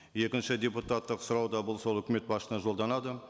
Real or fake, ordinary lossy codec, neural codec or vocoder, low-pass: real; none; none; none